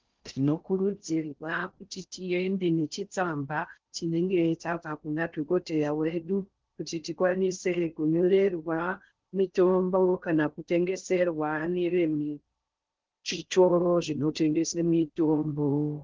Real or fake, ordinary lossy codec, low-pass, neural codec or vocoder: fake; Opus, 16 kbps; 7.2 kHz; codec, 16 kHz in and 24 kHz out, 0.6 kbps, FocalCodec, streaming, 4096 codes